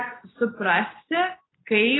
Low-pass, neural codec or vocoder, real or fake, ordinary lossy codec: 7.2 kHz; none; real; AAC, 16 kbps